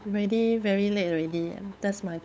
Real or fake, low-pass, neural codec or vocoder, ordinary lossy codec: fake; none; codec, 16 kHz, 8 kbps, FunCodec, trained on LibriTTS, 25 frames a second; none